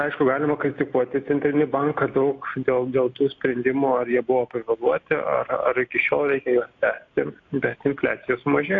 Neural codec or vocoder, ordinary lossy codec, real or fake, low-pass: none; AAC, 48 kbps; real; 7.2 kHz